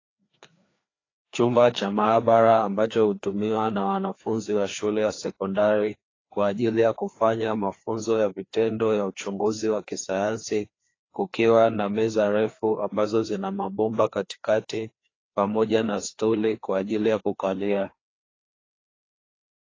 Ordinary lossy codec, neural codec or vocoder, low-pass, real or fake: AAC, 32 kbps; codec, 16 kHz, 2 kbps, FreqCodec, larger model; 7.2 kHz; fake